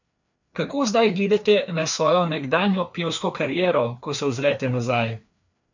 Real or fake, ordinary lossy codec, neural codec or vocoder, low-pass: fake; none; codec, 16 kHz, 2 kbps, FreqCodec, larger model; 7.2 kHz